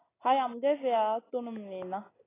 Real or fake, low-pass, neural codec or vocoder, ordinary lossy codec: real; 3.6 kHz; none; AAC, 16 kbps